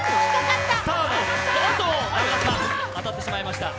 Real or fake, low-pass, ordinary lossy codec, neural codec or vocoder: real; none; none; none